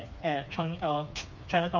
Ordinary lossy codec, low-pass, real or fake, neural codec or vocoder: none; 7.2 kHz; fake; codec, 16 kHz, 4 kbps, FreqCodec, smaller model